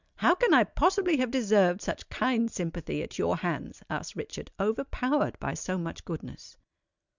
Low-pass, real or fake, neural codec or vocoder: 7.2 kHz; real; none